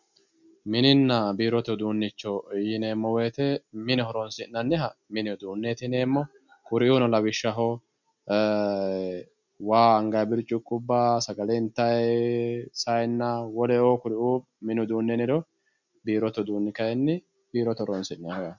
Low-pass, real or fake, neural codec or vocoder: 7.2 kHz; real; none